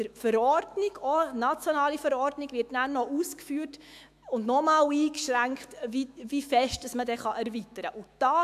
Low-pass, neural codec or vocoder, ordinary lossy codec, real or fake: 14.4 kHz; autoencoder, 48 kHz, 128 numbers a frame, DAC-VAE, trained on Japanese speech; AAC, 96 kbps; fake